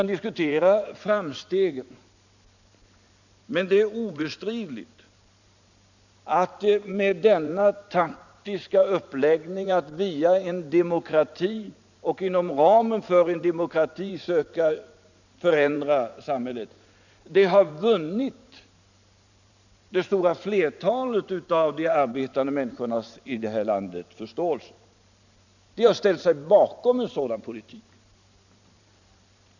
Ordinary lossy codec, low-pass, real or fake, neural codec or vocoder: none; 7.2 kHz; fake; vocoder, 44.1 kHz, 128 mel bands every 512 samples, BigVGAN v2